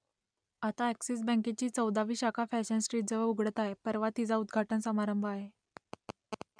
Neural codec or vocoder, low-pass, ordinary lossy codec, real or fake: none; 9.9 kHz; none; real